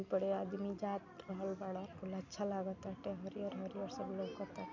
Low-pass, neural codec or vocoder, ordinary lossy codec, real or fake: 7.2 kHz; none; MP3, 64 kbps; real